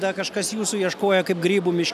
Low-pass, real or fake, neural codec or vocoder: 14.4 kHz; real; none